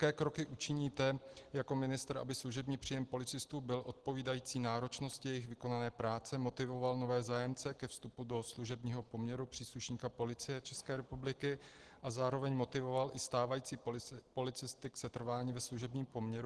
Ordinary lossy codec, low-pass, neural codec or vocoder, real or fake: Opus, 16 kbps; 10.8 kHz; none; real